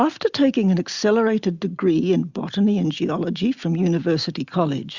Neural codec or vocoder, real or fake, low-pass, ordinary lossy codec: none; real; 7.2 kHz; Opus, 64 kbps